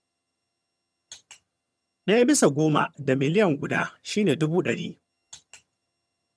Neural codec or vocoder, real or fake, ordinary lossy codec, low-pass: vocoder, 22.05 kHz, 80 mel bands, HiFi-GAN; fake; none; none